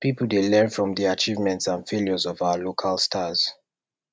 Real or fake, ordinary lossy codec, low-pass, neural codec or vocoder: real; none; none; none